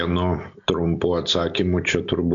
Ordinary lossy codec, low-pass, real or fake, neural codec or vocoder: MP3, 64 kbps; 7.2 kHz; real; none